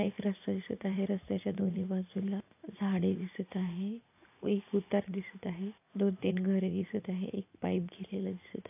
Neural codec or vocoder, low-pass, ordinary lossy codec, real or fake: vocoder, 44.1 kHz, 80 mel bands, Vocos; 3.6 kHz; AAC, 24 kbps; fake